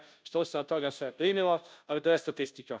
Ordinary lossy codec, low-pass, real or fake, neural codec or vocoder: none; none; fake; codec, 16 kHz, 0.5 kbps, FunCodec, trained on Chinese and English, 25 frames a second